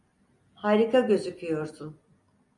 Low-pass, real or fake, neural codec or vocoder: 10.8 kHz; real; none